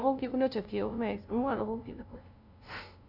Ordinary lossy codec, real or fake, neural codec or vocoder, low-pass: none; fake; codec, 16 kHz, 0.5 kbps, FunCodec, trained on LibriTTS, 25 frames a second; 5.4 kHz